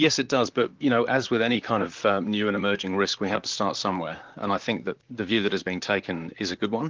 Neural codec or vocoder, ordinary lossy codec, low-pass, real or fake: vocoder, 44.1 kHz, 128 mel bands, Pupu-Vocoder; Opus, 32 kbps; 7.2 kHz; fake